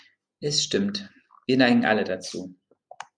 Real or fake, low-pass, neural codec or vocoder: real; 9.9 kHz; none